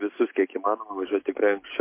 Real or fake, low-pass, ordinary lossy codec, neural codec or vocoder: real; 3.6 kHz; MP3, 24 kbps; none